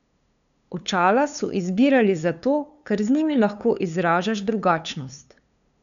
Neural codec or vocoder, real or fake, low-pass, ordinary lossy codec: codec, 16 kHz, 2 kbps, FunCodec, trained on LibriTTS, 25 frames a second; fake; 7.2 kHz; none